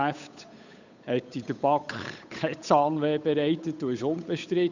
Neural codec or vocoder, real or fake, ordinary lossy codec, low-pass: codec, 16 kHz, 8 kbps, FunCodec, trained on Chinese and English, 25 frames a second; fake; none; 7.2 kHz